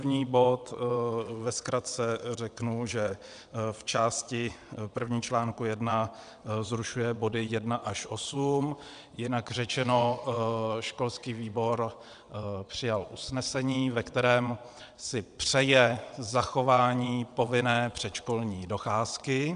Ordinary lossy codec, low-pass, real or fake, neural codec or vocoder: MP3, 96 kbps; 9.9 kHz; fake; vocoder, 22.05 kHz, 80 mel bands, WaveNeXt